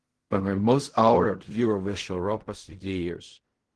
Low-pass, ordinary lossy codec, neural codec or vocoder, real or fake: 10.8 kHz; Opus, 16 kbps; codec, 16 kHz in and 24 kHz out, 0.4 kbps, LongCat-Audio-Codec, fine tuned four codebook decoder; fake